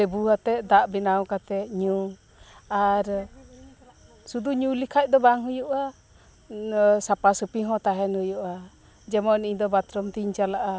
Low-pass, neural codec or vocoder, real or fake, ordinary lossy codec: none; none; real; none